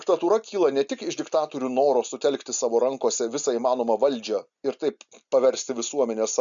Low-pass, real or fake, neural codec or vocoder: 7.2 kHz; real; none